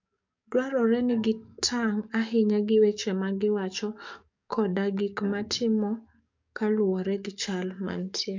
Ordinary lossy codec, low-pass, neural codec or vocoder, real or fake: MP3, 64 kbps; 7.2 kHz; codec, 44.1 kHz, 7.8 kbps, DAC; fake